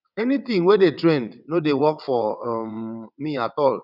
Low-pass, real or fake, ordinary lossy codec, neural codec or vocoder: 5.4 kHz; fake; none; vocoder, 22.05 kHz, 80 mel bands, WaveNeXt